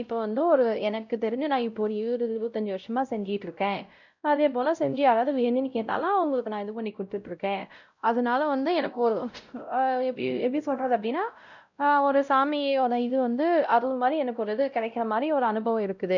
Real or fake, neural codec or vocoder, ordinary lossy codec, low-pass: fake; codec, 16 kHz, 0.5 kbps, X-Codec, WavLM features, trained on Multilingual LibriSpeech; none; 7.2 kHz